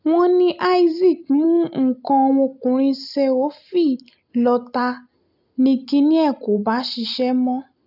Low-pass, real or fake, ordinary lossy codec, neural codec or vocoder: 5.4 kHz; real; none; none